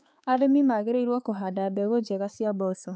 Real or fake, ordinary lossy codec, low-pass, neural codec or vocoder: fake; none; none; codec, 16 kHz, 4 kbps, X-Codec, HuBERT features, trained on balanced general audio